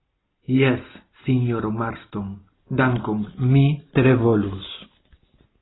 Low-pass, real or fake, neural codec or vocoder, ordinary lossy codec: 7.2 kHz; real; none; AAC, 16 kbps